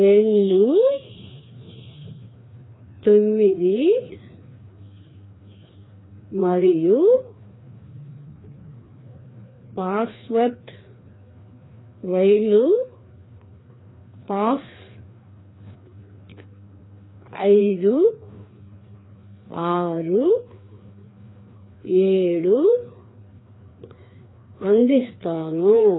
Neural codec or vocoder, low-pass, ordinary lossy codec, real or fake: codec, 16 kHz, 2 kbps, FreqCodec, larger model; 7.2 kHz; AAC, 16 kbps; fake